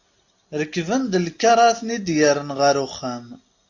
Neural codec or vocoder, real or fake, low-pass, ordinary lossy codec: none; real; 7.2 kHz; AAC, 48 kbps